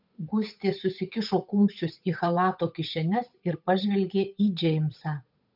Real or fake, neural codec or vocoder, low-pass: fake; codec, 16 kHz, 8 kbps, FunCodec, trained on Chinese and English, 25 frames a second; 5.4 kHz